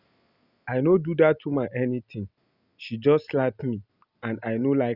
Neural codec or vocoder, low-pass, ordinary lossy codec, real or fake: none; 5.4 kHz; Opus, 64 kbps; real